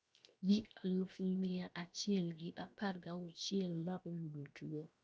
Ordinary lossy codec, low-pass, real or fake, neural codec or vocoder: none; none; fake; codec, 16 kHz, 0.7 kbps, FocalCodec